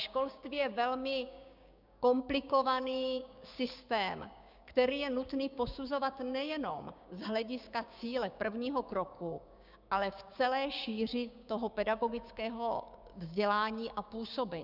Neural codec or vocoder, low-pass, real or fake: codec, 16 kHz, 6 kbps, DAC; 5.4 kHz; fake